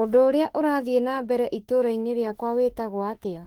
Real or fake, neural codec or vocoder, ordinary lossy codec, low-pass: fake; autoencoder, 48 kHz, 32 numbers a frame, DAC-VAE, trained on Japanese speech; Opus, 16 kbps; 19.8 kHz